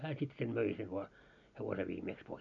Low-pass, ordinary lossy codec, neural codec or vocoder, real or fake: 7.2 kHz; none; none; real